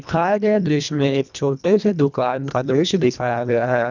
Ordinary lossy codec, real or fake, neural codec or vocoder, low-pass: none; fake; codec, 24 kHz, 1.5 kbps, HILCodec; 7.2 kHz